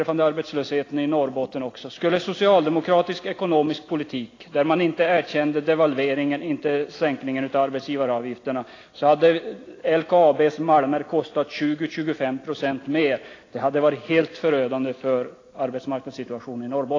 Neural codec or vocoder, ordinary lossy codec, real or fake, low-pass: none; AAC, 32 kbps; real; 7.2 kHz